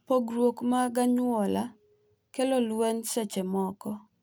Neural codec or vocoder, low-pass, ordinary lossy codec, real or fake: none; none; none; real